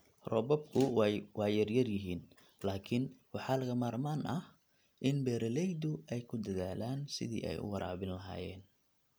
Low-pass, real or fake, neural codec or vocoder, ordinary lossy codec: none; real; none; none